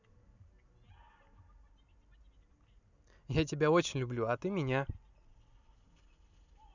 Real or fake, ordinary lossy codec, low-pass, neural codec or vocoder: real; none; 7.2 kHz; none